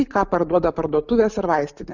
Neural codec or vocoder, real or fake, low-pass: none; real; 7.2 kHz